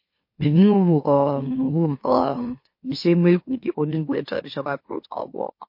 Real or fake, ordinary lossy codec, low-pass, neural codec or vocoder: fake; MP3, 32 kbps; 5.4 kHz; autoencoder, 44.1 kHz, a latent of 192 numbers a frame, MeloTTS